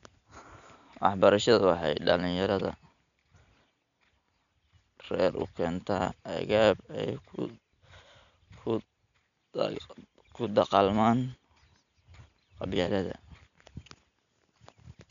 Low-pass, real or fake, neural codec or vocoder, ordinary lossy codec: 7.2 kHz; real; none; MP3, 96 kbps